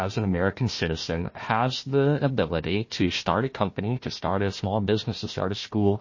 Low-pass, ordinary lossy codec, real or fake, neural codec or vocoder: 7.2 kHz; MP3, 32 kbps; fake; codec, 16 kHz, 1 kbps, FunCodec, trained on Chinese and English, 50 frames a second